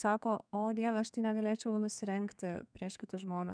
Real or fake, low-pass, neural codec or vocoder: fake; 9.9 kHz; codec, 32 kHz, 1.9 kbps, SNAC